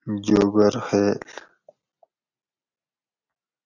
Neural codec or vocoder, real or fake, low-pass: none; real; 7.2 kHz